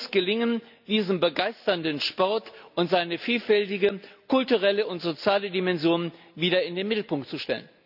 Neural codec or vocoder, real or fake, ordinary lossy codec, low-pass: none; real; none; 5.4 kHz